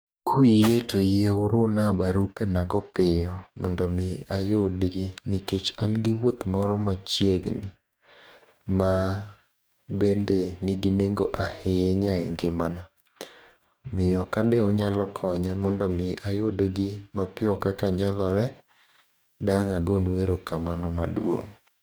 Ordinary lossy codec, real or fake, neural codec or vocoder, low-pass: none; fake; codec, 44.1 kHz, 2.6 kbps, DAC; none